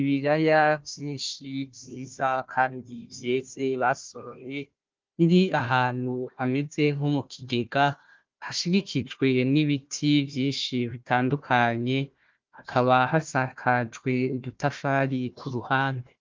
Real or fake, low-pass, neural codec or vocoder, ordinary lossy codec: fake; 7.2 kHz; codec, 16 kHz, 1 kbps, FunCodec, trained on Chinese and English, 50 frames a second; Opus, 32 kbps